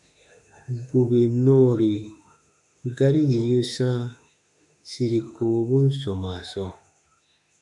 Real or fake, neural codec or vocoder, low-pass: fake; autoencoder, 48 kHz, 32 numbers a frame, DAC-VAE, trained on Japanese speech; 10.8 kHz